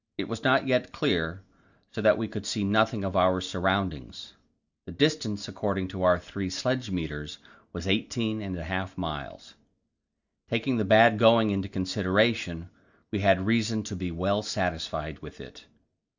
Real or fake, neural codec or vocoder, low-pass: real; none; 7.2 kHz